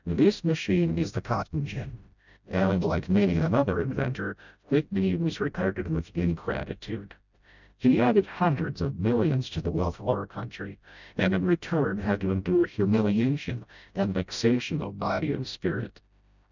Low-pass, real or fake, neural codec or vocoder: 7.2 kHz; fake; codec, 16 kHz, 0.5 kbps, FreqCodec, smaller model